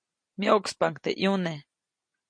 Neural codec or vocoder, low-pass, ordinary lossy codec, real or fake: none; 9.9 kHz; MP3, 48 kbps; real